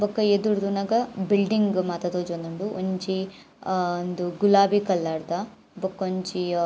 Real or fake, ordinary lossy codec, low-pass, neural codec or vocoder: real; none; none; none